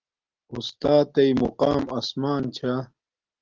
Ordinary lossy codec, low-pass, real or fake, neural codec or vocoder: Opus, 16 kbps; 7.2 kHz; real; none